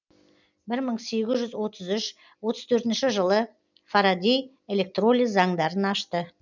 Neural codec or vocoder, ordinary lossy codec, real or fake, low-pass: none; none; real; 7.2 kHz